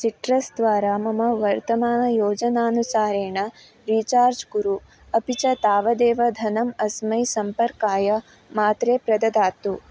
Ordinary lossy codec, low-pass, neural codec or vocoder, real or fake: none; none; none; real